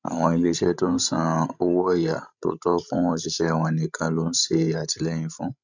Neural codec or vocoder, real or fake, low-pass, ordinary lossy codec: codec, 16 kHz, 16 kbps, FreqCodec, larger model; fake; 7.2 kHz; none